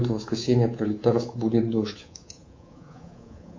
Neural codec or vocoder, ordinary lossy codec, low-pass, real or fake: codec, 24 kHz, 3.1 kbps, DualCodec; MP3, 48 kbps; 7.2 kHz; fake